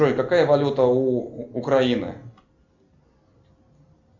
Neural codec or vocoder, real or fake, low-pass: none; real; 7.2 kHz